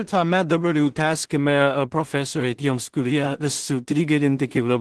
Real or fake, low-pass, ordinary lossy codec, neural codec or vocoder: fake; 10.8 kHz; Opus, 16 kbps; codec, 16 kHz in and 24 kHz out, 0.4 kbps, LongCat-Audio-Codec, two codebook decoder